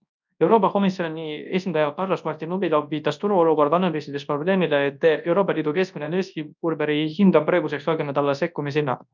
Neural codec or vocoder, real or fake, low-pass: codec, 24 kHz, 0.9 kbps, WavTokenizer, large speech release; fake; 7.2 kHz